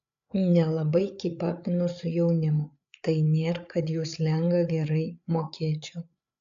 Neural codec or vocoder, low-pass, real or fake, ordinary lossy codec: codec, 16 kHz, 8 kbps, FreqCodec, larger model; 7.2 kHz; fake; AAC, 64 kbps